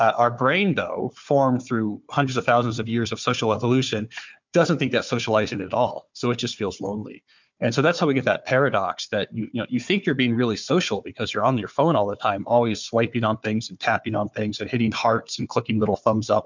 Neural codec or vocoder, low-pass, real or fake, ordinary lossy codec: codec, 16 kHz, 4 kbps, FunCodec, trained on Chinese and English, 50 frames a second; 7.2 kHz; fake; MP3, 64 kbps